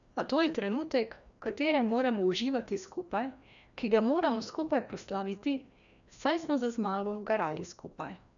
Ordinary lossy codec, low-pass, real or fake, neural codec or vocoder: none; 7.2 kHz; fake; codec, 16 kHz, 1 kbps, FreqCodec, larger model